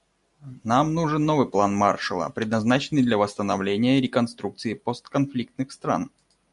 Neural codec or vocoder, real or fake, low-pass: none; real; 10.8 kHz